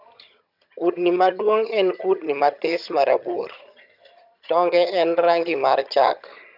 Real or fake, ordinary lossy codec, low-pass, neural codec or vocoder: fake; none; 5.4 kHz; vocoder, 22.05 kHz, 80 mel bands, HiFi-GAN